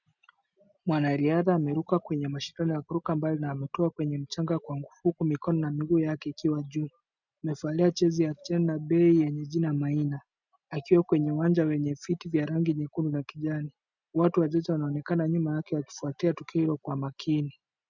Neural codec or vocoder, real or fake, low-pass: none; real; 7.2 kHz